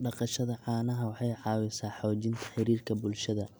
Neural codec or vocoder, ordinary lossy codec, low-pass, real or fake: none; none; none; real